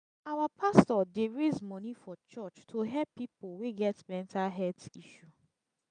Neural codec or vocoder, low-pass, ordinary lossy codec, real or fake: none; 9.9 kHz; none; real